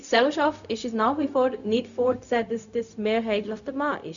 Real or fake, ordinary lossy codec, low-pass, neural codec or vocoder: fake; none; 7.2 kHz; codec, 16 kHz, 0.4 kbps, LongCat-Audio-Codec